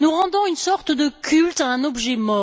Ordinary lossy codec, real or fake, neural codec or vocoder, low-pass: none; real; none; none